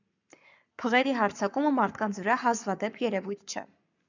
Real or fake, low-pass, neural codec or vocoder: fake; 7.2 kHz; codec, 44.1 kHz, 7.8 kbps, Pupu-Codec